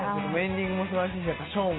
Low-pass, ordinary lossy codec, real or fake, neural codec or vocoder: 7.2 kHz; AAC, 16 kbps; real; none